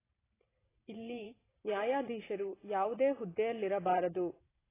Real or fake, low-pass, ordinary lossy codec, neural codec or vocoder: fake; 3.6 kHz; AAC, 16 kbps; vocoder, 44.1 kHz, 128 mel bands every 512 samples, BigVGAN v2